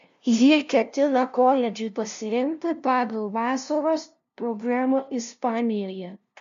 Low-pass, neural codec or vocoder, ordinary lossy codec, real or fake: 7.2 kHz; codec, 16 kHz, 0.5 kbps, FunCodec, trained on LibriTTS, 25 frames a second; MP3, 64 kbps; fake